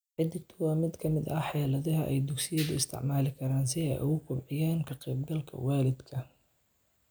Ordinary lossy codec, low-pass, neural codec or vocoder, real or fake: none; none; none; real